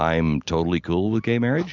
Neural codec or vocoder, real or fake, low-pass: none; real; 7.2 kHz